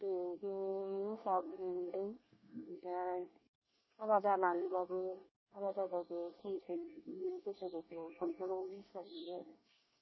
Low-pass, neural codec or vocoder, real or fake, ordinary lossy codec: 7.2 kHz; codec, 24 kHz, 1 kbps, SNAC; fake; MP3, 24 kbps